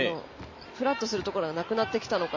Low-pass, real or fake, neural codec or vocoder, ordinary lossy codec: 7.2 kHz; real; none; MP3, 32 kbps